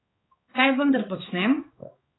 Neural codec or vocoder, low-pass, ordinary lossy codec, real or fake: codec, 16 kHz, 4 kbps, X-Codec, HuBERT features, trained on balanced general audio; 7.2 kHz; AAC, 16 kbps; fake